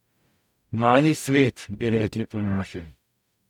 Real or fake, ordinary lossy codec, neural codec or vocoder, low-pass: fake; none; codec, 44.1 kHz, 0.9 kbps, DAC; 19.8 kHz